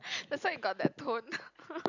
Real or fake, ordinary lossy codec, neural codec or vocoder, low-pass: real; none; none; 7.2 kHz